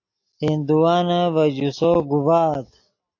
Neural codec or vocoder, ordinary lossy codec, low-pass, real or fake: none; AAC, 48 kbps; 7.2 kHz; real